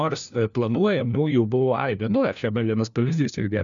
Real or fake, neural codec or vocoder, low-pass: fake; codec, 16 kHz, 1 kbps, FunCodec, trained on LibriTTS, 50 frames a second; 7.2 kHz